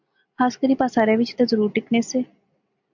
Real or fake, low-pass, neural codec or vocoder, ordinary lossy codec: real; 7.2 kHz; none; MP3, 64 kbps